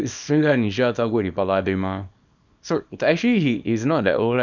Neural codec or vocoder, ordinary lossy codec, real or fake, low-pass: codec, 24 kHz, 0.9 kbps, WavTokenizer, small release; none; fake; 7.2 kHz